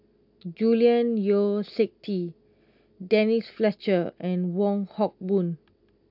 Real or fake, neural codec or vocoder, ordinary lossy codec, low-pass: real; none; AAC, 48 kbps; 5.4 kHz